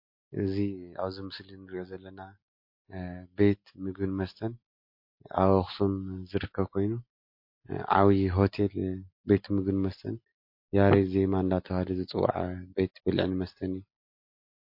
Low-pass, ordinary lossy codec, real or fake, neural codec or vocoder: 5.4 kHz; MP3, 32 kbps; real; none